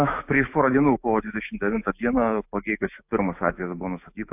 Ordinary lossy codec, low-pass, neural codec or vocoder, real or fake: AAC, 32 kbps; 3.6 kHz; autoencoder, 48 kHz, 128 numbers a frame, DAC-VAE, trained on Japanese speech; fake